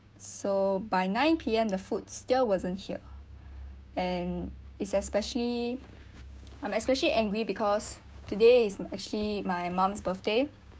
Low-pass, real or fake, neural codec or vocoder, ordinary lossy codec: none; fake; codec, 16 kHz, 6 kbps, DAC; none